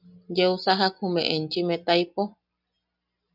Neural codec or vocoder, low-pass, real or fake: none; 5.4 kHz; real